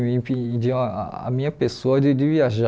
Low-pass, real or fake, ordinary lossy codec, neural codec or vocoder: none; real; none; none